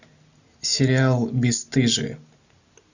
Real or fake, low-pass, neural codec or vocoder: real; 7.2 kHz; none